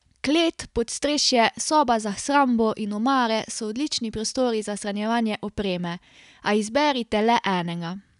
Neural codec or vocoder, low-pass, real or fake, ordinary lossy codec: none; 10.8 kHz; real; none